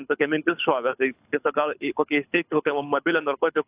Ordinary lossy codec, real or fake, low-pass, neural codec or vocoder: AAC, 32 kbps; fake; 3.6 kHz; codec, 24 kHz, 6 kbps, HILCodec